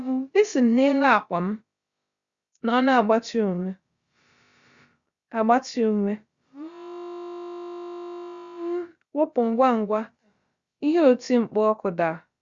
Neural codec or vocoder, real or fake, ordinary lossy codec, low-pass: codec, 16 kHz, about 1 kbps, DyCAST, with the encoder's durations; fake; Opus, 64 kbps; 7.2 kHz